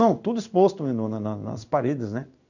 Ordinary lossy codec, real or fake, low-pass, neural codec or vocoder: none; fake; 7.2 kHz; codec, 16 kHz in and 24 kHz out, 1 kbps, XY-Tokenizer